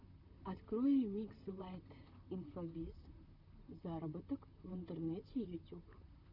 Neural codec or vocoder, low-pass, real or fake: vocoder, 44.1 kHz, 128 mel bands, Pupu-Vocoder; 5.4 kHz; fake